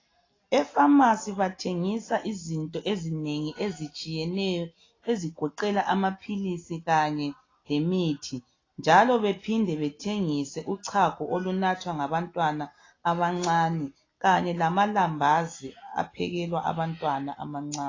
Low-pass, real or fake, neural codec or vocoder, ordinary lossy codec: 7.2 kHz; real; none; AAC, 32 kbps